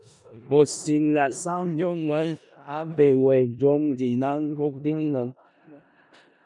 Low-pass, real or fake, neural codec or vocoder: 10.8 kHz; fake; codec, 16 kHz in and 24 kHz out, 0.4 kbps, LongCat-Audio-Codec, four codebook decoder